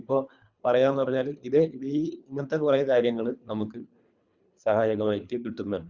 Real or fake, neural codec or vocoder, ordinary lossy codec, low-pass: fake; codec, 24 kHz, 3 kbps, HILCodec; Opus, 64 kbps; 7.2 kHz